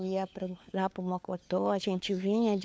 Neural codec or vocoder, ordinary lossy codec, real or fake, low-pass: codec, 16 kHz, 4 kbps, FunCodec, trained on LibriTTS, 50 frames a second; none; fake; none